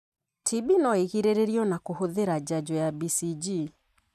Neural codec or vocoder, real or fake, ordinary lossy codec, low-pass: none; real; none; 14.4 kHz